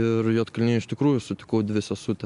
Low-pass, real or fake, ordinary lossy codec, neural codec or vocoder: 10.8 kHz; real; MP3, 64 kbps; none